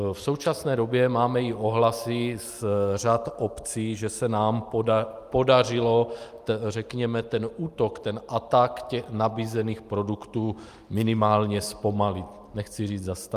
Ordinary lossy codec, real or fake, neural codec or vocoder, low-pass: Opus, 32 kbps; real; none; 14.4 kHz